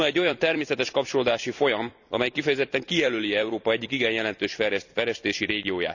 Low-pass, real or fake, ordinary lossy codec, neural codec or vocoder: 7.2 kHz; fake; none; vocoder, 44.1 kHz, 128 mel bands every 256 samples, BigVGAN v2